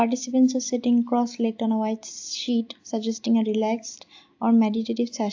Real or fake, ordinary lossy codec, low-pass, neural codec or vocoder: real; AAC, 48 kbps; 7.2 kHz; none